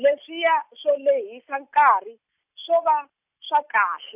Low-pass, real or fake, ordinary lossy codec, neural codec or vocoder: 3.6 kHz; real; none; none